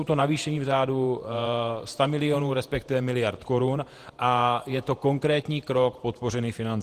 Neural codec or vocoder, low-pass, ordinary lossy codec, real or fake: vocoder, 48 kHz, 128 mel bands, Vocos; 14.4 kHz; Opus, 24 kbps; fake